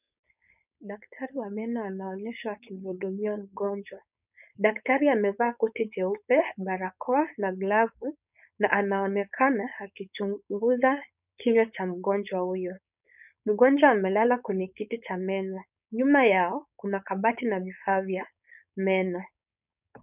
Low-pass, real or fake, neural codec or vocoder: 3.6 kHz; fake; codec, 16 kHz, 4.8 kbps, FACodec